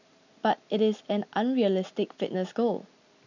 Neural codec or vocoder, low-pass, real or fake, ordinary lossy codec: none; 7.2 kHz; real; none